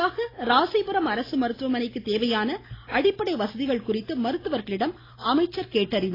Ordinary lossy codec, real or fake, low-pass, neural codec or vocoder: AAC, 24 kbps; real; 5.4 kHz; none